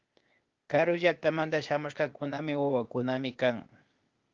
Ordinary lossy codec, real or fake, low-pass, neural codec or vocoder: Opus, 32 kbps; fake; 7.2 kHz; codec, 16 kHz, 0.8 kbps, ZipCodec